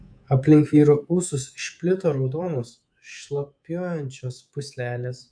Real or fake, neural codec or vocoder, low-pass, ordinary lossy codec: fake; codec, 24 kHz, 3.1 kbps, DualCodec; 9.9 kHz; AAC, 64 kbps